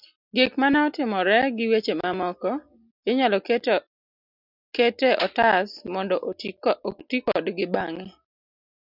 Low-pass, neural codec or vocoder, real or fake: 5.4 kHz; none; real